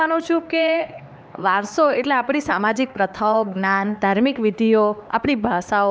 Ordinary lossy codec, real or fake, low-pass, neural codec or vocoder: none; fake; none; codec, 16 kHz, 4 kbps, X-Codec, HuBERT features, trained on LibriSpeech